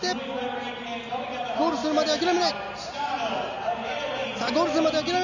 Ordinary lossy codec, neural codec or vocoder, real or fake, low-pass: none; none; real; 7.2 kHz